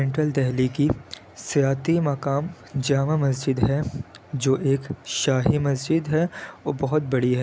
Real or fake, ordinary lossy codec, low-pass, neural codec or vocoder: real; none; none; none